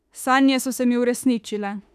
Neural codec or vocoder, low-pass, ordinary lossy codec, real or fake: autoencoder, 48 kHz, 32 numbers a frame, DAC-VAE, trained on Japanese speech; 14.4 kHz; none; fake